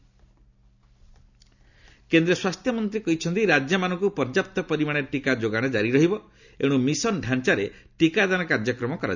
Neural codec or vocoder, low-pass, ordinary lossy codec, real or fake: none; 7.2 kHz; none; real